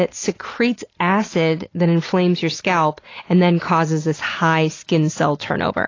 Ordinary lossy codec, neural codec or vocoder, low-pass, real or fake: AAC, 32 kbps; none; 7.2 kHz; real